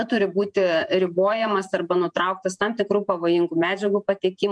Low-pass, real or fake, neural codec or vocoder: 9.9 kHz; fake; vocoder, 48 kHz, 128 mel bands, Vocos